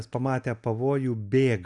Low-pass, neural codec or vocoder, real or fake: 10.8 kHz; none; real